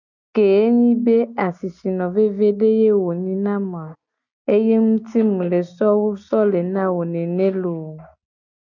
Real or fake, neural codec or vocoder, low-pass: real; none; 7.2 kHz